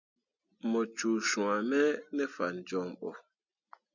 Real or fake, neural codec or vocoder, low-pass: real; none; 7.2 kHz